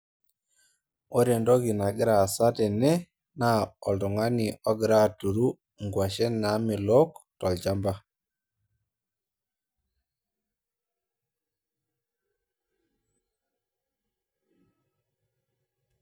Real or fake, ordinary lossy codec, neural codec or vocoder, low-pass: real; none; none; none